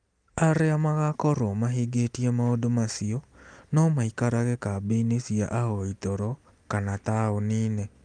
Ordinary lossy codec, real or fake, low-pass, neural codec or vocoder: Opus, 32 kbps; real; 9.9 kHz; none